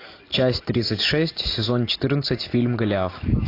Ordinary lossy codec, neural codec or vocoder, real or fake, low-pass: AAC, 32 kbps; none; real; 5.4 kHz